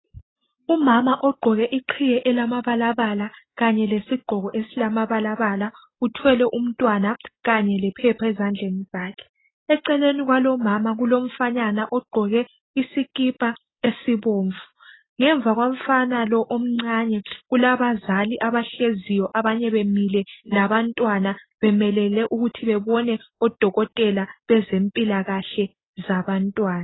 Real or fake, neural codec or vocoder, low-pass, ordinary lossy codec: real; none; 7.2 kHz; AAC, 16 kbps